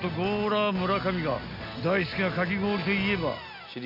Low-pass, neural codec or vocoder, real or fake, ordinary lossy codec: 5.4 kHz; none; real; AAC, 48 kbps